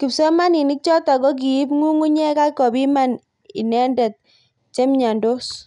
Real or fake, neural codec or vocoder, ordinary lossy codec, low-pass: real; none; none; 10.8 kHz